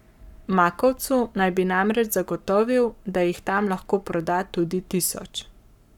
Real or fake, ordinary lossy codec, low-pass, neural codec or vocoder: fake; none; 19.8 kHz; codec, 44.1 kHz, 7.8 kbps, Pupu-Codec